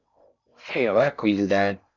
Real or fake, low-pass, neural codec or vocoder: fake; 7.2 kHz; codec, 16 kHz in and 24 kHz out, 0.6 kbps, FocalCodec, streaming, 4096 codes